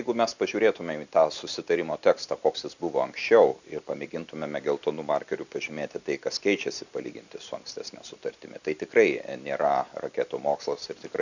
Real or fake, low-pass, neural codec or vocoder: real; 7.2 kHz; none